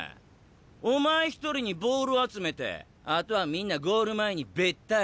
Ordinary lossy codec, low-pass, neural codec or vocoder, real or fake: none; none; none; real